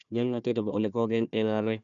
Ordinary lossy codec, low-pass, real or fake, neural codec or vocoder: none; 7.2 kHz; fake; codec, 16 kHz, 1 kbps, FunCodec, trained on Chinese and English, 50 frames a second